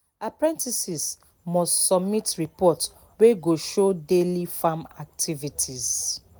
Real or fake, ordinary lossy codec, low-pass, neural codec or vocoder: real; none; none; none